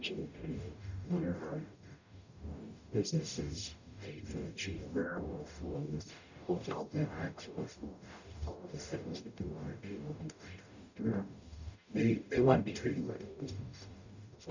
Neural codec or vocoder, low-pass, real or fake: codec, 44.1 kHz, 0.9 kbps, DAC; 7.2 kHz; fake